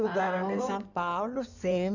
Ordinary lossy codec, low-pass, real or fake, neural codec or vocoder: none; 7.2 kHz; fake; codec, 16 kHz in and 24 kHz out, 2.2 kbps, FireRedTTS-2 codec